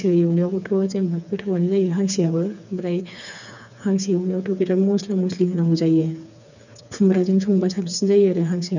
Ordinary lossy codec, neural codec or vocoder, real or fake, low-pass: none; codec, 16 kHz, 4 kbps, FreqCodec, smaller model; fake; 7.2 kHz